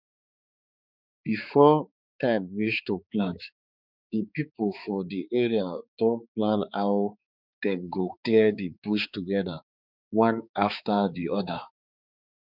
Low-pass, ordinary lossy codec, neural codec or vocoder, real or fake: 5.4 kHz; none; codec, 16 kHz, 4 kbps, X-Codec, HuBERT features, trained on balanced general audio; fake